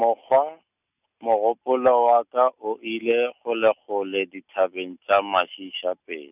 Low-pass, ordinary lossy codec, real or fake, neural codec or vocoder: 3.6 kHz; none; real; none